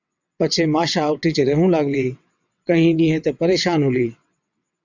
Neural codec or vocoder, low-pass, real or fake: vocoder, 22.05 kHz, 80 mel bands, WaveNeXt; 7.2 kHz; fake